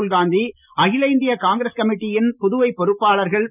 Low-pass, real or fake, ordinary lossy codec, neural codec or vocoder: 3.6 kHz; real; none; none